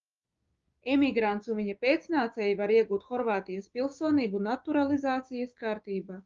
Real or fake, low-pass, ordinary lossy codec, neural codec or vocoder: fake; 7.2 kHz; Opus, 32 kbps; codec, 16 kHz, 6 kbps, DAC